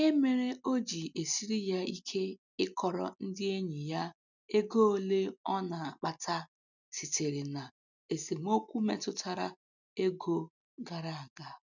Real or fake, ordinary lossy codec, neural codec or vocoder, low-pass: real; none; none; 7.2 kHz